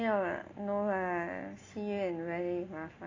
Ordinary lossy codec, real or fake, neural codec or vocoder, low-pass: AAC, 32 kbps; real; none; 7.2 kHz